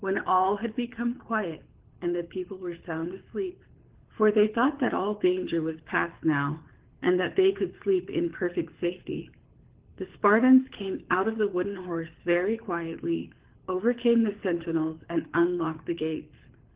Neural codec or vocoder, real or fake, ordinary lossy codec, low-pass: codec, 24 kHz, 6 kbps, HILCodec; fake; Opus, 16 kbps; 3.6 kHz